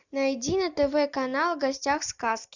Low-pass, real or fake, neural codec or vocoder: 7.2 kHz; real; none